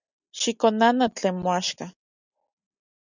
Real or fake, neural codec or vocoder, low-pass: real; none; 7.2 kHz